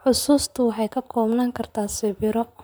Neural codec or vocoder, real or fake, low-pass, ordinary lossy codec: vocoder, 44.1 kHz, 128 mel bands, Pupu-Vocoder; fake; none; none